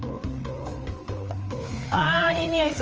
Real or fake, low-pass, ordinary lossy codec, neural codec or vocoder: fake; 7.2 kHz; Opus, 24 kbps; codec, 16 kHz, 4 kbps, FreqCodec, larger model